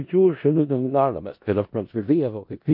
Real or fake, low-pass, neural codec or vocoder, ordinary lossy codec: fake; 5.4 kHz; codec, 16 kHz in and 24 kHz out, 0.4 kbps, LongCat-Audio-Codec, four codebook decoder; MP3, 32 kbps